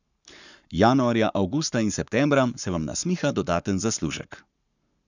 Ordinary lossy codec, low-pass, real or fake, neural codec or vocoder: none; 7.2 kHz; fake; codec, 44.1 kHz, 7.8 kbps, Pupu-Codec